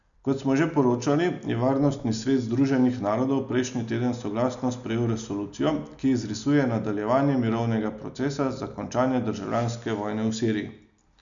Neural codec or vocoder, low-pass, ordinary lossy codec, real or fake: none; 7.2 kHz; MP3, 96 kbps; real